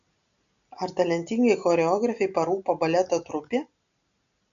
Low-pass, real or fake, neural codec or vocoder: 7.2 kHz; real; none